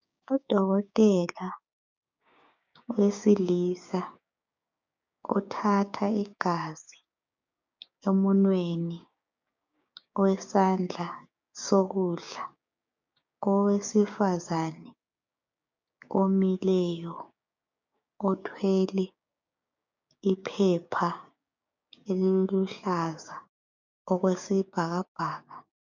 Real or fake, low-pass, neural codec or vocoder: fake; 7.2 kHz; codec, 16 kHz, 6 kbps, DAC